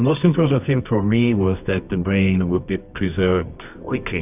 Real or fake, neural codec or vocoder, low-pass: fake; codec, 24 kHz, 0.9 kbps, WavTokenizer, medium music audio release; 3.6 kHz